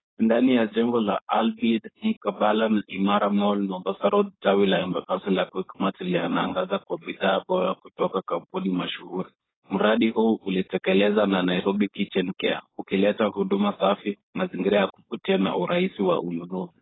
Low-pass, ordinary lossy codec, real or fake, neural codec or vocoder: 7.2 kHz; AAC, 16 kbps; fake; codec, 16 kHz, 4.8 kbps, FACodec